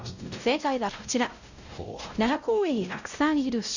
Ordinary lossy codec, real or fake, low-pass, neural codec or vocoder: none; fake; 7.2 kHz; codec, 16 kHz, 0.5 kbps, X-Codec, WavLM features, trained on Multilingual LibriSpeech